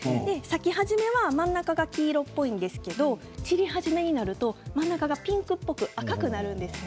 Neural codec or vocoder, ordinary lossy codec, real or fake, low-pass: none; none; real; none